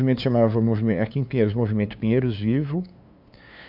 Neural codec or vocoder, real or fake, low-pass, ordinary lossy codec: codec, 16 kHz, 2 kbps, FunCodec, trained on LibriTTS, 25 frames a second; fake; 5.4 kHz; AAC, 48 kbps